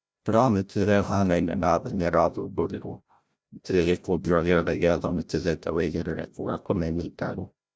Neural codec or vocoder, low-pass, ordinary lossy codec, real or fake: codec, 16 kHz, 0.5 kbps, FreqCodec, larger model; none; none; fake